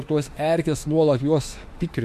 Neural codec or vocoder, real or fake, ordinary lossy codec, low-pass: autoencoder, 48 kHz, 32 numbers a frame, DAC-VAE, trained on Japanese speech; fake; MP3, 64 kbps; 14.4 kHz